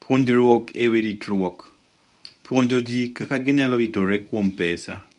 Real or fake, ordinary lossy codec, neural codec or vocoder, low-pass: fake; none; codec, 24 kHz, 0.9 kbps, WavTokenizer, medium speech release version 1; 10.8 kHz